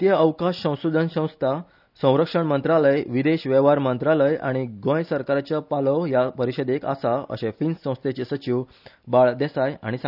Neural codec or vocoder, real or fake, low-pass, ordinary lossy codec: none; real; 5.4 kHz; none